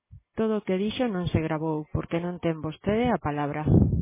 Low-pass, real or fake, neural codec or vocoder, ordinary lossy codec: 3.6 kHz; real; none; MP3, 16 kbps